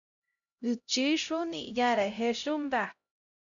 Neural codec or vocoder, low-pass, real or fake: codec, 16 kHz, 0.5 kbps, X-Codec, HuBERT features, trained on LibriSpeech; 7.2 kHz; fake